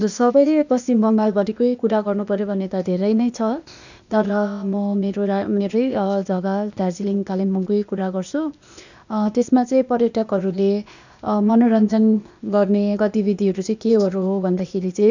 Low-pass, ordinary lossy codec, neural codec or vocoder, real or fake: 7.2 kHz; none; codec, 16 kHz, 0.8 kbps, ZipCodec; fake